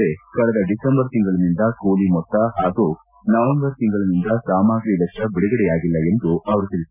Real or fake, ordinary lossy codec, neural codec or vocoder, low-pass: real; none; none; 3.6 kHz